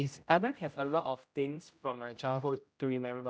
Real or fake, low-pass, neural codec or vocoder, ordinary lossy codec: fake; none; codec, 16 kHz, 0.5 kbps, X-Codec, HuBERT features, trained on general audio; none